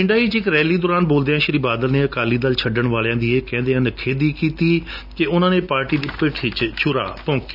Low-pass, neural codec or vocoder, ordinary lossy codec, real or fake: 5.4 kHz; none; none; real